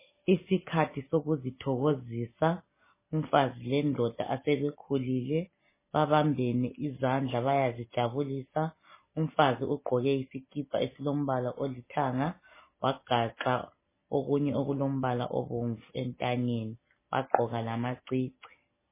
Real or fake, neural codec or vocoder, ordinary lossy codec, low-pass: real; none; MP3, 16 kbps; 3.6 kHz